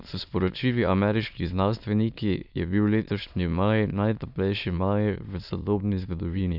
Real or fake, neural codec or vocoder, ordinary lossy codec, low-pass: fake; autoencoder, 22.05 kHz, a latent of 192 numbers a frame, VITS, trained on many speakers; none; 5.4 kHz